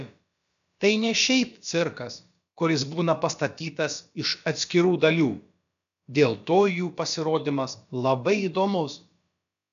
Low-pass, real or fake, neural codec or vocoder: 7.2 kHz; fake; codec, 16 kHz, about 1 kbps, DyCAST, with the encoder's durations